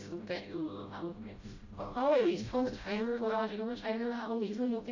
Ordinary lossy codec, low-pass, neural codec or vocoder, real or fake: AAC, 48 kbps; 7.2 kHz; codec, 16 kHz, 0.5 kbps, FreqCodec, smaller model; fake